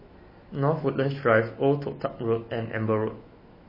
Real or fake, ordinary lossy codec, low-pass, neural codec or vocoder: fake; MP3, 24 kbps; 5.4 kHz; autoencoder, 48 kHz, 128 numbers a frame, DAC-VAE, trained on Japanese speech